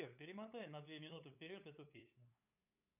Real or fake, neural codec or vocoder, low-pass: fake; codec, 16 kHz, 8 kbps, FunCodec, trained on LibriTTS, 25 frames a second; 3.6 kHz